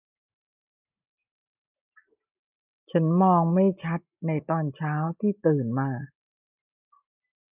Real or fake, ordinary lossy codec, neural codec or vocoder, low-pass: real; none; none; 3.6 kHz